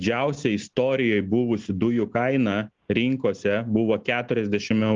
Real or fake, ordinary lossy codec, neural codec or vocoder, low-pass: real; Opus, 32 kbps; none; 7.2 kHz